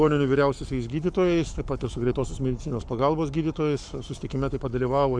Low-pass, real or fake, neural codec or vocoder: 9.9 kHz; fake; codec, 44.1 kHz, 7.8 kbps, Pupu-Codec